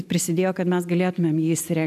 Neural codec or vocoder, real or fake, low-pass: none; real; 14.4 kHz